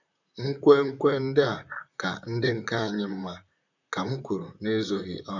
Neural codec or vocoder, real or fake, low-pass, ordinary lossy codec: vocoder, 44.1 kHz, 128 mel bands, Pupu-Vocoder; fake; 7.2 kHz; none